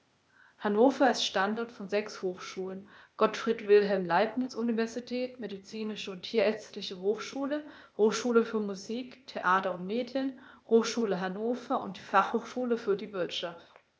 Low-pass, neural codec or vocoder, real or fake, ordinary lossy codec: none; codec, 16 kHz, 0.8 kbps, ZipCodec; fake; none